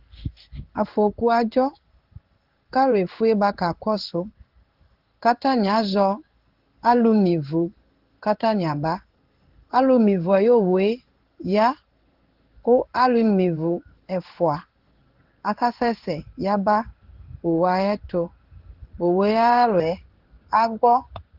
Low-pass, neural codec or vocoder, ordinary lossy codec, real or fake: 5.4 kHz; codec, 16 kHz in and 24 kHz out, 1 kbps, XY-Tokenizer; Opus, 16 kbps; fake